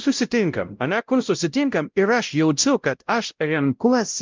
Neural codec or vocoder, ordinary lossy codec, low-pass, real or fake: codec, 16 kHz, 0.5 kbps, X-Codec, WavLM features, trained on Multilingual LibriSpeech; Opus, 24 kbps; 7.2 kHz; fake